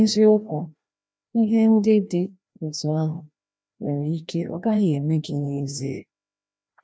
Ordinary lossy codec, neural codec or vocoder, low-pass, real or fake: none; codec, 16 kHz, 1 kbps, FreqCodec, larger model; none; fake